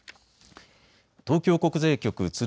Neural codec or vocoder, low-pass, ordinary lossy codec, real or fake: none; none; none; real